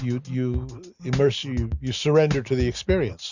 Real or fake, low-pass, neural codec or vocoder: real; 7.2 kHz; none